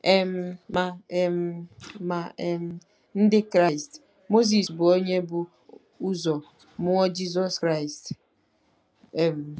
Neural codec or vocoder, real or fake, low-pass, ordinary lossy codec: none; real; none; none